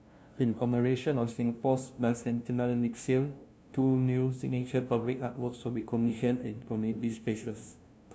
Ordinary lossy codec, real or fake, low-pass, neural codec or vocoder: none; fake; none; codec, 16 kHz, 0.5 kbps, FunCodec, trained on LibriTTS, 25 frames a second